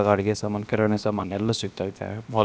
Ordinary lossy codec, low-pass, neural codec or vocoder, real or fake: none; none; codec, 16 kHz, 0.7 kbps, FocalCodec; fake